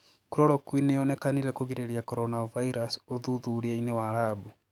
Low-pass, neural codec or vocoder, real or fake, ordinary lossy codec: 19.8 kHz; codec, 44.1 kHz, 7.8 kbps, DAC; fake; none